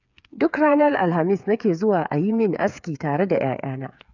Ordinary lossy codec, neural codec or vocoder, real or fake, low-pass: none; codec, 16 kHz, 8 kbps, FreqCodec, smaller model; fake; 7.2 kHz